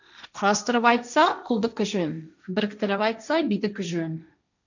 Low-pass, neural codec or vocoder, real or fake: 7.2 kHz; codec, 16 kHz, 1.1 kbps, Voila-Tokenizer; fake